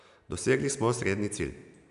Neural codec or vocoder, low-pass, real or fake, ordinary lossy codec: none; 10.8 kHz; real; none